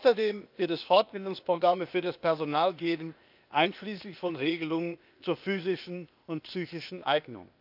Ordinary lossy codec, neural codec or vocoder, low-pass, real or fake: none; codec, 16 kHz, 0.8 kbps, ZipCodec; 5.4 kHz; fake